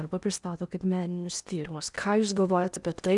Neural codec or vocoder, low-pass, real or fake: codec, 16 kHz in and 24 kHz out, 0.8 kbps, FocalCodec, streaming, 65536 codes; 10.8 kHz; fake